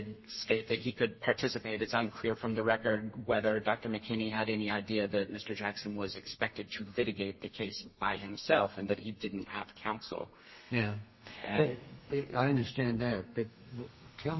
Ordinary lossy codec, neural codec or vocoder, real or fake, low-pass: MP3, 24 kbps; codec, 32 kHz, 1.9 kbps, SNAC; fake; 7.2 kHz